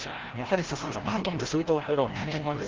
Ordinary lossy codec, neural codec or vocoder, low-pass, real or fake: Opus, 16 kbps; codec, 16 kHz, 0.5 kbps, FreqCodec, larger model; 7.2 kHz; fake